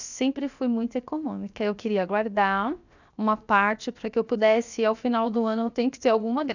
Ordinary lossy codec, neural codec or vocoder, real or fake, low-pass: none; codec, 16 kHz, 0.7 kbps, FocalCodec; fake; 7.2 kHz